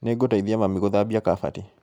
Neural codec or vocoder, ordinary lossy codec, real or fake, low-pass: none; none; real; 19.8 kHz